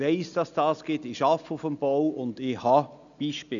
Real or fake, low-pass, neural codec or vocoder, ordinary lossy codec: real; 7.2 kHz; none; none